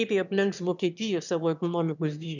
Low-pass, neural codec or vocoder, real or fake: 7.2 kHz; autoencoder, 22.05 kHz, a latent of 192 numbers a frame, VITS, trained on one speaker; fake